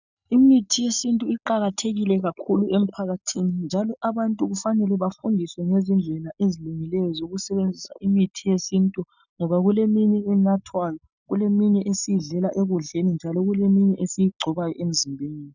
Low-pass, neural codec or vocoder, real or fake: 7.2 kHz; none; real